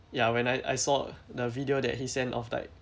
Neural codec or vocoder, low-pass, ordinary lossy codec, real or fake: none; none; none; real